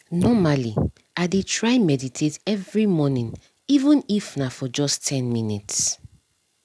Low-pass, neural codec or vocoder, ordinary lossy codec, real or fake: none; none; none; real